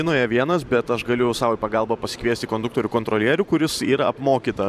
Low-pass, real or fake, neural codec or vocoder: 14.4 kHz; fake; vocoder, 44.1 kHz, 128 mel bands every 512 samples, BigVGAN v2